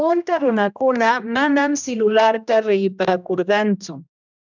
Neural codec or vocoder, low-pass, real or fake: codec, 16 kHz, 1 kbps, X-Codec, HuBERT features, trained on general audio; 7.2 kHz; fake